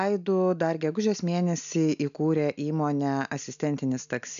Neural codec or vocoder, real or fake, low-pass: none; real; 7.2 kHz